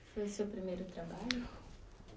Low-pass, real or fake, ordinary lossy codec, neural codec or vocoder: none; real; none; none